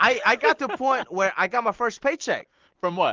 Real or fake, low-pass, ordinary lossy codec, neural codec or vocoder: real; 7.2 kHz; Opus, 16 kbps; none